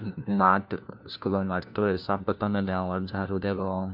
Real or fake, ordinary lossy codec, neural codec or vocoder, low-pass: fake; MP3, 48 kbps; codec, 16 kHz, 1 kbps, FunCodec, trained on LibriTTS, 50 frames a second; 5.4 kHz